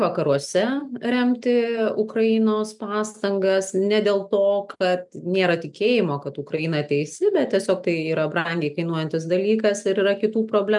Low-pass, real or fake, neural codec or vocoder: 10.8 kHz; real; none